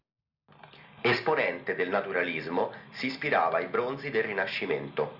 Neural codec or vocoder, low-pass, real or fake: none; 5.4 kHz; real